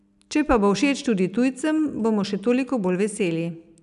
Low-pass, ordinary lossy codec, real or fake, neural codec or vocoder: 10.8 kHz; none; real; none